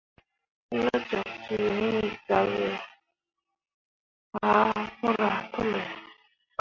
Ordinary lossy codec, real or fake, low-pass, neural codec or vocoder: MP3, 48 kbps; real; 7.2 kHz; none